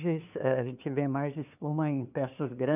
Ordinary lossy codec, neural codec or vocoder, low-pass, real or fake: none; codec, 16 kHz, 2 kbps, FunCodec, trained on LibriTTS, 25 frames a second; 3.6 kHz; fake